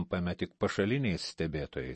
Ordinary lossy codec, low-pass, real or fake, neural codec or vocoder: MP3, 32 kbps; 10.8 kHz; real; none